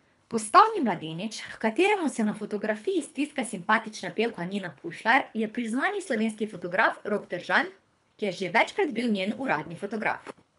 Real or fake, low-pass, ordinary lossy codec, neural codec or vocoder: fake; 10.8 kHz; none; codec, 24 kHz, 3 kbps, HILCodec